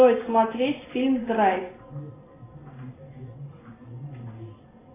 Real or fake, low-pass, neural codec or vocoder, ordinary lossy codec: real; 3.6 kHz; none; AAC, 16 kbps